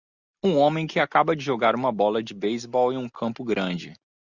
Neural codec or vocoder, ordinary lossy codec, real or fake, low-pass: none; Opus, 64 kbps; real; 7.2 kHz